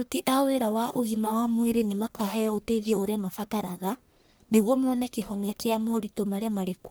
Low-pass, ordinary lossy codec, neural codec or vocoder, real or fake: none; none; codec, 44.1 kHz, 1.7 kbps, Pupu-Codec; fake